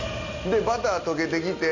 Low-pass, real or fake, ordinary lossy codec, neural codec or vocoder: 7.2 kHz; real; none; none